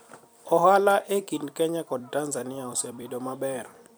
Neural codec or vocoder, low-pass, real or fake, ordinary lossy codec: vocoder, 44.1 kHz, 128 mel bands every 256 samples, BigVGAN v2; none; fake; none